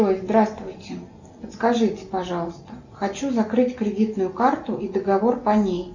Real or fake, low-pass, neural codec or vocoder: real; 7.2 kHz; none